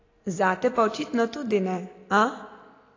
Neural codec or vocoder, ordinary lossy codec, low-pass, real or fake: none; AAC, 32 kbps; 7.2 kHz; real